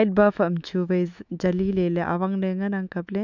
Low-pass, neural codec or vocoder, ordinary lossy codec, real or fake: 7.2 kHz; autoencoder, 48 kHz, 128 numbers a frame, DAC-VAE, trained on Japanese speech; none; fake